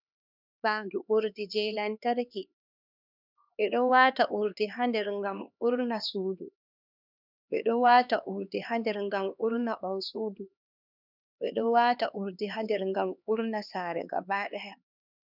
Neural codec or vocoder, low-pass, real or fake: codec, 16 kHz, 2 kbps, X-Codec, HuBERT features, trained on LibriSpeech; 5.4 kHz; fake